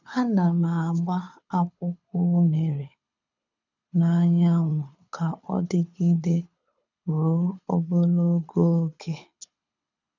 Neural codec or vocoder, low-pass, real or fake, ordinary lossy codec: codec, 24 kHz, 6 kbps, HILCodec; 7.2 kHz; fake; none